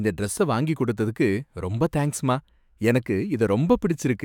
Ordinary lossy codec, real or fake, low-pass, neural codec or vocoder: none; fake; 19.8 kHz; autoencoder, 48 kHz, 128 numbers a frame, DAC-VAE, trained on Japanese speech